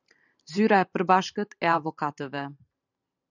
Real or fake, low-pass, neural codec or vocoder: fake; 7.2 kHz; vocoder, 44.1 kHz, 128 mel bands every 512 samples, BigVGAN v2